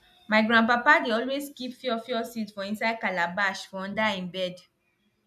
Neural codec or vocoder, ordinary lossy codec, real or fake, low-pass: none; none; real; 14.4 kHz